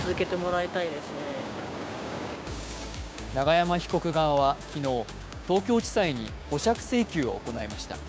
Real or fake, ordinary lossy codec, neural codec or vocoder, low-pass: fake; none; codec, 16 kHz, 6 kbps, DAC; none